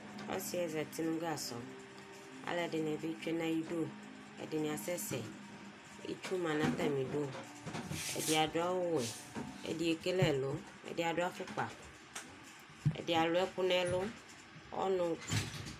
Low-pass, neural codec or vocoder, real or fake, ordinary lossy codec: 14.4 kHz; none; real; MP3, 96 kbps